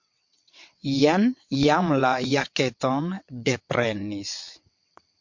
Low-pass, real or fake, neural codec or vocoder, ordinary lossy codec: 7.2 kHz; fake; vocoder, 22.05 kHz, 80 mel bands, WaveNeXt; MP3, 48 kbps